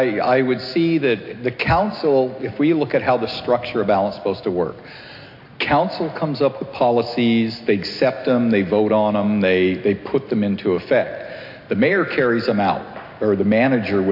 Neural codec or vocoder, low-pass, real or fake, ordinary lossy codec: none; 5.4 kHz; real; MP3, 32 kbps